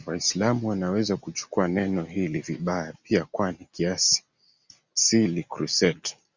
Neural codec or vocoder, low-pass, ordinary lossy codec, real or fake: none; 7.2 kHz; Opus, 64 kbps; real